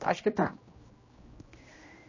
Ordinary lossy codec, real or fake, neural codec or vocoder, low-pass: MP3, 32 kbps; fake; codec, 16 kHz, 1 kbps, X-Codec, HuBERT features, trained on general audio; 7.2 kHz